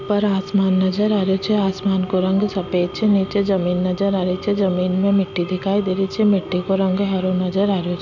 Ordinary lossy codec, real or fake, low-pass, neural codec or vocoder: MP3, 64 kbps; real; 7.2 kHz; none